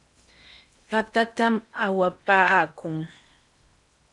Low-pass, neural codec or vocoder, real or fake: 10.8 kHz; codec, 16 kHz in and 24 kHz out, 0.8 kbps, FocalCodec, streaming, 65536 codes; fake